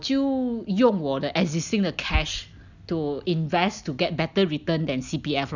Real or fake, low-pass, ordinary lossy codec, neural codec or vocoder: real; 7.2 kHz; none; none